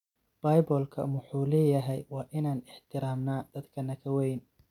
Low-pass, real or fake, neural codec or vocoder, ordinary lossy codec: 19.8 kHz; real; none; none